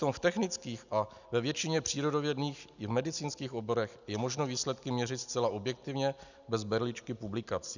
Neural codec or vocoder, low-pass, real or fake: none; 7.2 kHz; real